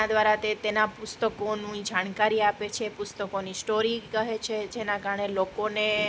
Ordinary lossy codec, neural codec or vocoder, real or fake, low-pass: none; none; real; none